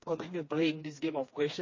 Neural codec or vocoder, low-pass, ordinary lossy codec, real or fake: codec, 16 kHz, 2 kbps, FreqCodec, smaller model; 7.2 kHz; MP3, 32 kbps; fake